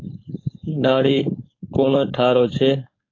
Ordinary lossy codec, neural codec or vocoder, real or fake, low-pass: AAC, 32 kbps; codec, 16 kHz, 4.8 kbps, FACodec; fake; 7.2 kHz